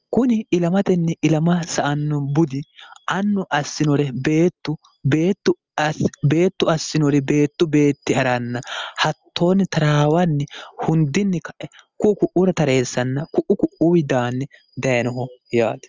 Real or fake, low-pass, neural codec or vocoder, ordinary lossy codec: real; 7.2 kHz; none; Opus, 32 kbps